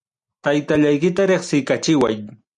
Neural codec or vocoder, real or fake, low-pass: none; real; 10.8 kHz